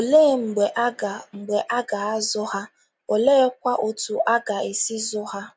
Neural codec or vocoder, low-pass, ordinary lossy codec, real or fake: none; none; none; real